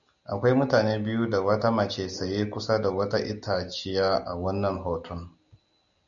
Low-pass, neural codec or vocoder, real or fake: 7.2 kHz; none; real